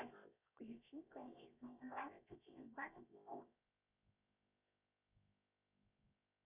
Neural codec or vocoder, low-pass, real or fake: codec, 16 kHz, 0.7 kbps, FocalCodec; 3.6 kHz; fake